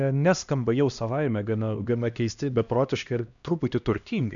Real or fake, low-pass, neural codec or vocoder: fake; 7.2 kHz; codec, 16 kHz, 1 kbps, X-Codec, HuBERT features, trained on LibriSpeech